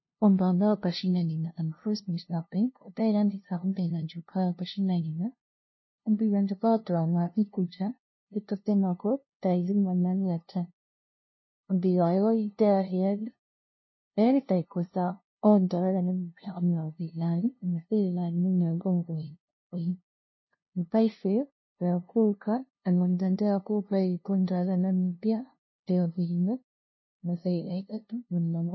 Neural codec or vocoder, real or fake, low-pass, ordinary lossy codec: codec, 16 kHz, 0.5 kbps, FunCodec, trained on LibriTTS, 25 frames a second; fake; 7.2 kHz; MP3, 24 kbps